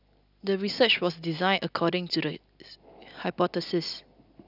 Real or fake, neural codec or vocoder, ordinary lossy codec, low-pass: real; none; none; 5.4 kHz